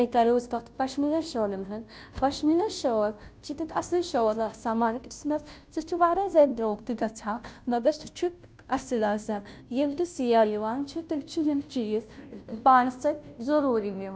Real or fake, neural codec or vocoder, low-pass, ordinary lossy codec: fake; codec, 16 kHz, 0.5 kbps, FunCodec, trained on Chinese and English, 25 frames a second; none; none